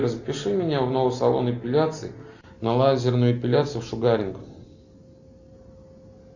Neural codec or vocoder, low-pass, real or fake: none; 7.2 kHz; real